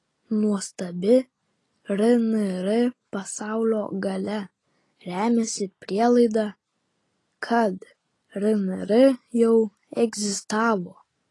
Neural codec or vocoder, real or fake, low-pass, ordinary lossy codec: none; real; 10.8 kHz; AAC, 32 kbps